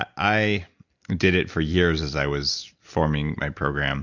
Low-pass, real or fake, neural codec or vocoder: 7.2 kHz; real; none